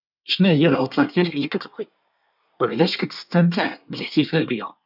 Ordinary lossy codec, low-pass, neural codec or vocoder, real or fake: none; 5.4 kHz; codec, 24 kHz, 1 kbps, SNAC; fake